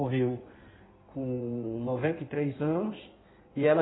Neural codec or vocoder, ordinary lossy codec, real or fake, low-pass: codec, 16 kHz in and 24 kHz out, 1.1 kbps, FireRedTTS-2 codec; AAC, 16 kbps; fake; 7.2 kHz